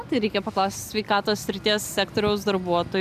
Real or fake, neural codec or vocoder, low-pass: real; none; 14.4 kHz